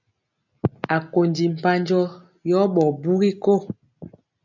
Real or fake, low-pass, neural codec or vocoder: real; 7.2 kHz; none